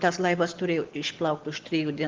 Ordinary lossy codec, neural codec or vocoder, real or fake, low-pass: Opus, 16 kbps; none; real; 7.2 kHz